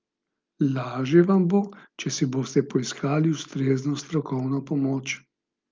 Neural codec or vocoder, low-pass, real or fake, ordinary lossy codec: none; 7.2 kHz; real; Opus, 24 kbps